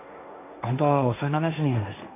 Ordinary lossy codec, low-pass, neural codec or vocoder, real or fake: AAC, 24 kbps; 3.6 kHz; codec, 16 kHz, 1.1 kbps, Voila-Tokenizer; fake